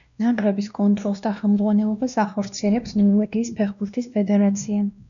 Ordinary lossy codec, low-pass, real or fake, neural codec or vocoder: MP3, 96 kbps; 7.2 kHz; fake; codec, 16 kHz, 1 kbps, X-Codec, WavLM features, trained on Multilingual LibriSpeech